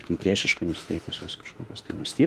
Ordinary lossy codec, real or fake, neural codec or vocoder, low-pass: Opus, 24 kbps; fake; autoencoder, 48 kHz, 32 numbers a frame, DAC-VAE, trained on Japanese speech; 14.4 kHz